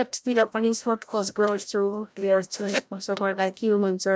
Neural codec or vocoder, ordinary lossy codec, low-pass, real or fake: codec, 16 kHz, 0.5 kbps, FreqCodec, larger model; none; none; fake